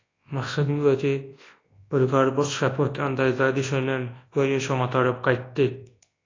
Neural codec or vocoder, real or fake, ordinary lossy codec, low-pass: codec, 24 kHz, 0.9 kbps, WavTokenizer, large speech release; fake; AAC, 32 kbps; 7.2 kHz